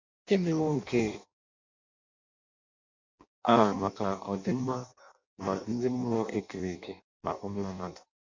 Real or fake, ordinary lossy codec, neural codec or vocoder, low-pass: fake; MP3, 48 kbps; codec, 16 kHz in and 24 kHz out, 0.6 kbps, FireRedTTS-2 codec; 7.2 kHz